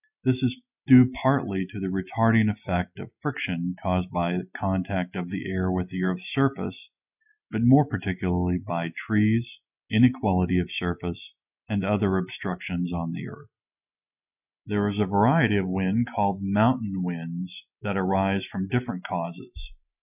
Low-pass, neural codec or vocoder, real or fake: 3.6 kHz; none; real